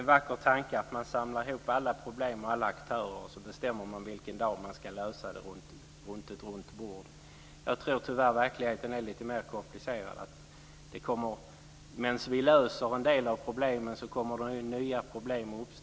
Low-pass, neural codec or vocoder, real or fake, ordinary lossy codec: none; none; real; none